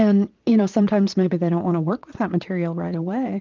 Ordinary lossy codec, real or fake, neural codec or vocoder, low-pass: Opus, 32 kbps; fake; vocoder, 22.05 kHz, 80 mel bands, Vocos; 7.2 kHz